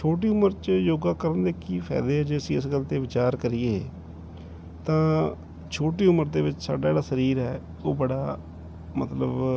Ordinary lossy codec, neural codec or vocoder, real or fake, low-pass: none; none; real; none